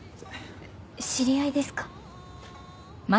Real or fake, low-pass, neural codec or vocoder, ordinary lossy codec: real; none; none; none